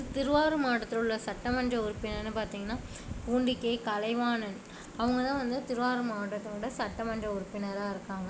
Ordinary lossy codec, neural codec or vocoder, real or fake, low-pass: none; none; real; none